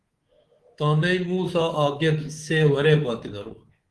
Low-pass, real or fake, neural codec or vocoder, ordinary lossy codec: 10.8 kHz; fake; codec, 24 kHz, 3.1 kbps, DualCodec; Opus, 16 kbps